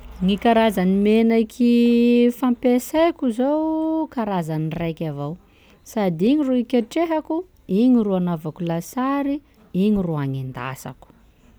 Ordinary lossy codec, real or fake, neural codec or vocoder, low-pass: none; real; none; none